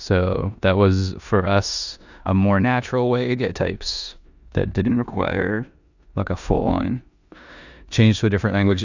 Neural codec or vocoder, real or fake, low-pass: codec, 16 kHz in and 24 kHz out, 0.9 kbps, LongCat-Audio-Codec, four codebook decoder; fake; 7.2 kHz